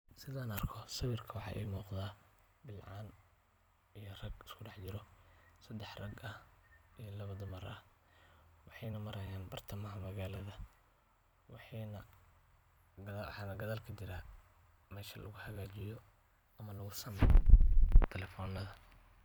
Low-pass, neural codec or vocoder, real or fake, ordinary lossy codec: 19.8 kHz; vocoder, 44.1 kHz, 128 mel bands every 256 samples, BigVGAN v2; fake; none